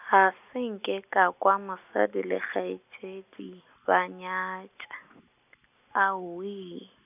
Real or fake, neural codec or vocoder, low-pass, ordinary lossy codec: real; none; 3.6 kHz; none